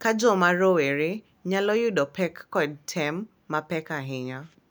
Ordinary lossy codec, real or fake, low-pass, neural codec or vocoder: none; real; none; none